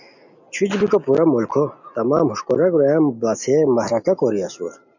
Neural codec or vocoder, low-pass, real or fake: none; 7.2 kHz; real